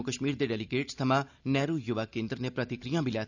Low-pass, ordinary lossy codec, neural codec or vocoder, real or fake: 7.2 kHz; none; none; real